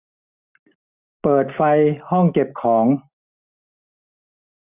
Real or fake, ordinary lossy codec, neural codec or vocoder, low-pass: real; none; none; 3.6 kHz